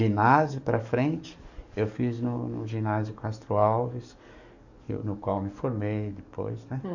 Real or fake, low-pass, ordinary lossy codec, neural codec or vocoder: fake; 7.2 kHz; none; codec, 44.1 kHz, 7.8 kbps, DAC